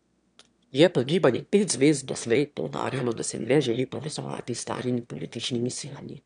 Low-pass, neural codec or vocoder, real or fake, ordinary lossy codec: 9.9 kHz; autoencoder, 22.05 kHz, a latent of 192 numbers a frame, VITS, trained on one speaker; fake; none